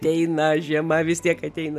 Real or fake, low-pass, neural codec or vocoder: real; 14.4 kHz; none